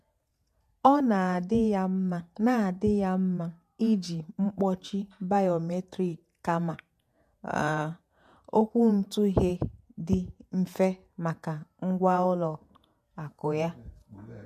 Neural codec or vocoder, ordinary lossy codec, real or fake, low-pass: vocoder, 48 kHz, 128 mel bands, Vocos; MP3, 64 kbps; fake; 14.4 kHz